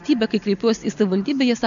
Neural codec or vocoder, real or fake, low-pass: none; real; 7.2 kHz